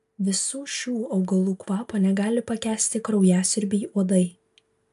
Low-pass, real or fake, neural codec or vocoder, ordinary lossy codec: 14.4 kHz; real; none; AAC, 96 kbps